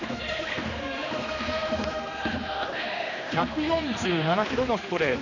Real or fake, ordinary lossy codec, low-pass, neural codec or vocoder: fake; none; 7.2 kHz; codec, 16 kHz, 2 kbps, X-Codec, HuBERT features, trained on general audio